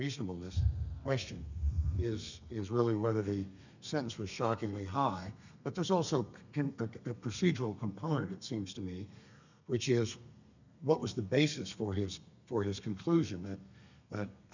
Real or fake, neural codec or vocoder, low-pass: fake; codec, 32 kHz, 1.9 kbps, SNAC; 7.2 kHz